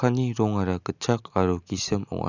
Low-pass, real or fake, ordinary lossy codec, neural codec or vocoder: 7.2 kHz; real; none; none